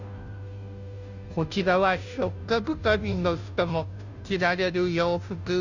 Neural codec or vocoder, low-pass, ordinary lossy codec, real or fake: codec, 16 kHz, 0.5 kbps, FunCodec, trained on Chinese and English, 25 frames a second; 7.2 kHz; none; fake